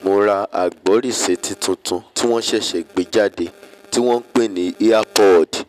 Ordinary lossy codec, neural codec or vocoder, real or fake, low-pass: none; none; real; 14.4 kHz